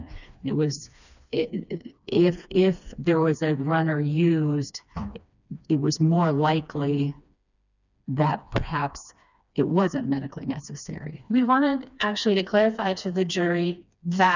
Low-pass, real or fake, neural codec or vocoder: 7.2 kHz; fake; codec, 16 kHz, 2 kbps, FreqCodec, smaller model